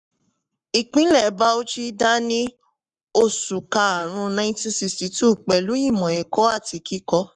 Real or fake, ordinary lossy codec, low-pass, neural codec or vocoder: fake; none; 10.8 kHz; codec, 44.1 kHz, 7.8 kbps, Pupu-Codec